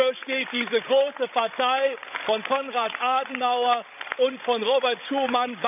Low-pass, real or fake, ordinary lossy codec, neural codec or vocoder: 3.6 kHz; fake; none; codec, 16 kHz, 16 kbps, FreqCodec, larger model